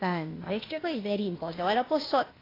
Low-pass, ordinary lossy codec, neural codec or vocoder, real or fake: 5.4 kHz; AAC, 24 kbps; codec, 16 kHz, 0.8 kbps, ZipCodec; fake